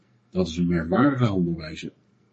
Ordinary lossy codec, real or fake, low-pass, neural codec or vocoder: MP3, 32 kbps; fake; 10.8 kHz; codec, 44.1 kHz, 2.6 kbps, SNAC